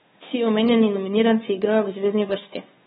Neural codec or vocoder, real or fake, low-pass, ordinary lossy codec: codec, 16 kHz, 2 kbps, FunCodec, trained on Chinese and English, 25 frames a second; fake; 7.2 kHz; AAC, 16 kbps